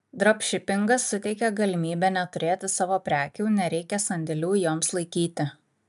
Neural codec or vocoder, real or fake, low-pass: none; real; 14.4 kHz